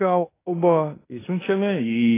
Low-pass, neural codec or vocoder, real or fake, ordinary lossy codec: 3.6 kHz; codec, 16 kHz in and 24 kHz out, 0.9 kbps, LongCat-Audio-Codec, fine tuned four codebook decoder; fake; AAC, 16 kbps